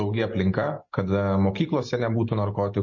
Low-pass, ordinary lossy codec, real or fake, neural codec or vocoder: 7.2 kHz; MP3, 32 kbps; real; none